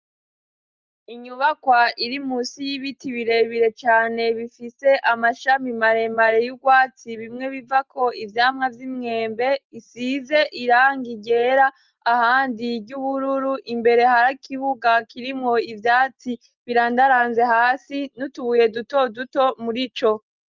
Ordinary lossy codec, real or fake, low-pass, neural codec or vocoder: Opus, 32 kbps; real; 7.2 kHz; none